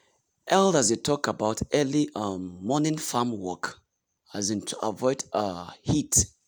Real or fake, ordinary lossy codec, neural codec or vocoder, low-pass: real; none; none; none